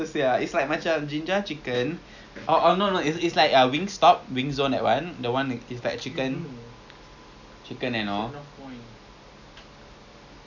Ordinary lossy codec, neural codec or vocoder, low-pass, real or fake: none; none; 7.2 kHz; real